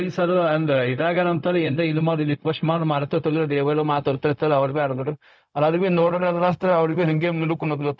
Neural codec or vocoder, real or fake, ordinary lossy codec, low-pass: codec, 16 kHz, 0.4 kbps, LongCat-Audio-Codec; fake; none; none